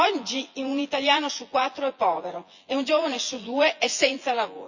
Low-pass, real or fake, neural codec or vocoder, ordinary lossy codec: 7.2 kHz; fake; vocoder, 24 kHz, 100 mel bands, Vocos; Opus, 64 kbps